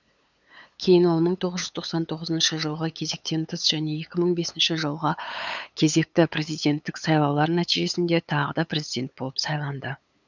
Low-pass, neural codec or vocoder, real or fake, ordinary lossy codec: 7.2 kHz; codec, 16 kHz, 8 kbps, FunCodec, trained on LibriTTS, 25 frames a second; fake; none